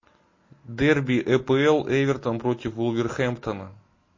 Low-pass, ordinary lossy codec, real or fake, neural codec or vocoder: 7.2 kHz; MP3, 32 kbps; real; none